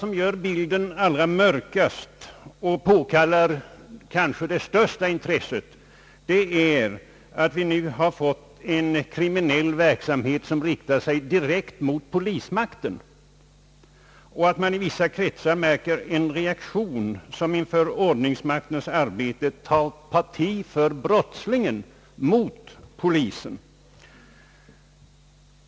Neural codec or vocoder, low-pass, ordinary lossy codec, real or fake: none; none; none; real